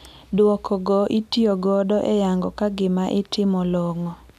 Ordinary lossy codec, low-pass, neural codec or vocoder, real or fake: none; 14.4 kHz; none; real